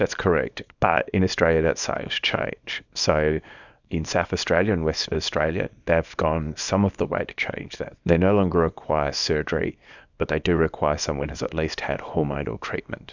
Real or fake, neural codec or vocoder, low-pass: fake; codec, 24 kHz, 0.9 kbps, WavTokenizer, small release; 7.2 kHz